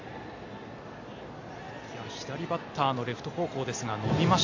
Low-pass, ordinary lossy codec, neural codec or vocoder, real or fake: 7.2 kHz; AAC, 48 kbps; none; real